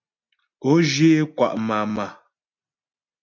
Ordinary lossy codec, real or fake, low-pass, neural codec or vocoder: MP3, 48 kbps; real; 7.2 kHz; none